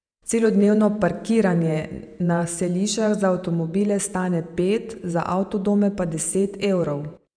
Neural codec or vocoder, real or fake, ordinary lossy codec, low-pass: vocoder, 48 kHz, 128 mel bands, Vocos; fake; none; 9.9 kHz